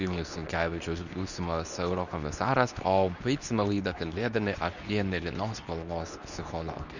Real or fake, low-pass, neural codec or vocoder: fake; 7.2 kHz; codec, 24 kHz, 0.9 kbps, WavTokenizer, medium speech release version 2